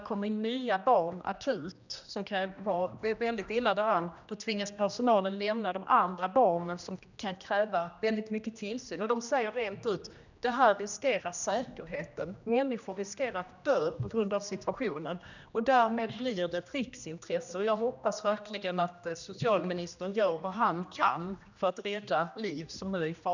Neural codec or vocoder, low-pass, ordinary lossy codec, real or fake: codec, 16 kHz, 1 kbps, X-Codec, HuBERT features, trained on general audio; 7.2 kHz; none; fake